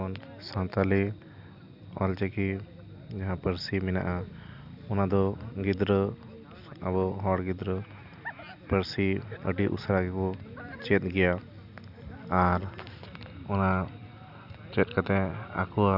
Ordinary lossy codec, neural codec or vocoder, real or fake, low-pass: none; none; real; 5.4 kHz